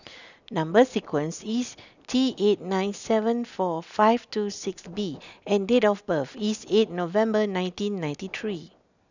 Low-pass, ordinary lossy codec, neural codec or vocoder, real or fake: 7.2 kHz; none; none; real